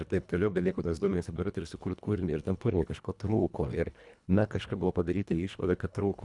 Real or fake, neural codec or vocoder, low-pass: fake; codec, 24 kHz, 1.5 kbps, HILCodec; 10.8 kHz